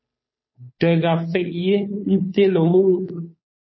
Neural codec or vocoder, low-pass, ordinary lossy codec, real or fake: codec, 16 kHz, 2 kbps, FunCodec, trained on Chinese and English, 25 frames a second; 7.2 kHz; MP3, 24 kbps; fake